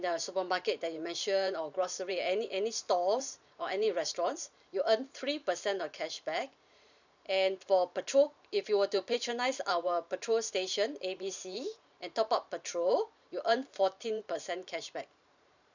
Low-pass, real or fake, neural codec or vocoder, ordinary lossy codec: 7.2 kHz; fake; vocoder, 44.1 kHz, 128 mel bands every 512 samples, BigVGAN v2; none